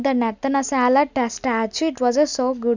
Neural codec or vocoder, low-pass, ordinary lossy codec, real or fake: none; 7.2 kHz; none; real